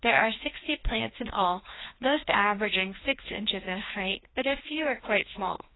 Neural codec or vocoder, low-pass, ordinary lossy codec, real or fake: codec, 16 kHz, 1 kbps, FreqCodec, larger model; 7.2 kHz; AAC, 16 kbps; fake